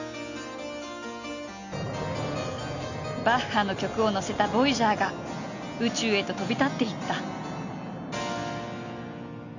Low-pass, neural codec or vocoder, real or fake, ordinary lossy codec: 7.2 kHz; none; real; none